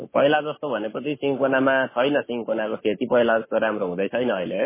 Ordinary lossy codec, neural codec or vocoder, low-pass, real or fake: MP3, 16 kbps; codec, 44.1 kHz, 7.8 kbps, Pupu-Codec; 3.6 kHz; fake